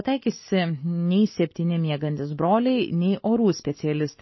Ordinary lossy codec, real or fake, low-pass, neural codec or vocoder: MP3, 24 kbps; real; 7.2 kHz; none